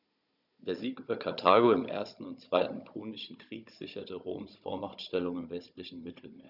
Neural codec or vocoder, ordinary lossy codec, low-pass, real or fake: codec, 16 kHz, 16 kbps, FunCodec, trained on Chinese and English, 50 frames a second; none; 5.4 kHz; fake